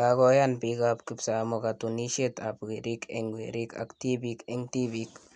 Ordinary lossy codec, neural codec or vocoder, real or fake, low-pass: none; none; real; 9.9 kHz